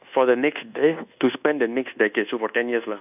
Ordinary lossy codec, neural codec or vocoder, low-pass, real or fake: none; codec, 24 kHz, 1.2 kbps, DualCodec; 3.6 kHz; fake